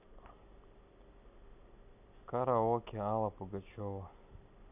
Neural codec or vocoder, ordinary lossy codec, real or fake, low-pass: none; none; real; 3.6 kHz